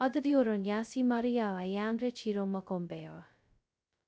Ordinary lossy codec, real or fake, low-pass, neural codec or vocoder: none; fake; none; codec, 16 kHz, 0.2 kbps, FocalCodec